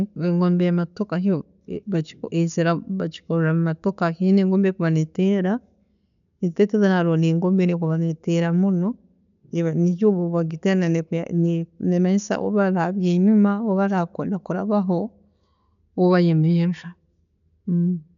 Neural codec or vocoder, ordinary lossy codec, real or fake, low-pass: none; none; real; 7.2 kHz